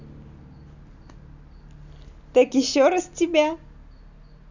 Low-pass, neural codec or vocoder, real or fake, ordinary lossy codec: 7.2 kHz; none; real; none